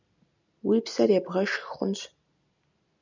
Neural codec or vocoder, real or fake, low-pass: none; real; 7.2 kHz